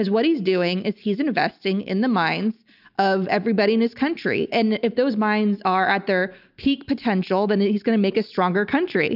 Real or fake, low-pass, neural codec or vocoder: real; 5.4 kHz; none